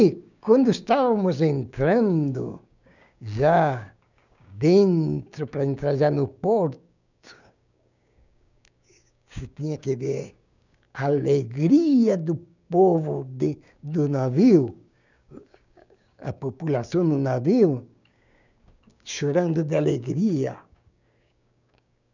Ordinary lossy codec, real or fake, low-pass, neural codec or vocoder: none; fake; 7.2 kHz; codec, 16 kHz, 6 kbps, DAC